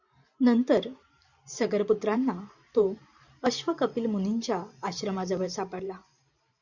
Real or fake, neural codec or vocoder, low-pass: fake; vocoder, 44.1 kHz, 128 mel bands every 512 samples, BigVGAN v2; 7.2 kHz